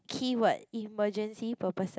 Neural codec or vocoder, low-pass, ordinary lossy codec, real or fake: none; none; none; real